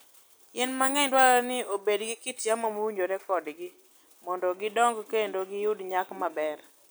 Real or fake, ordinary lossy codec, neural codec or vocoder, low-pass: real; none; none; none